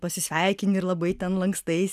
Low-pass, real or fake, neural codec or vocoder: 14.4 kHz; real; none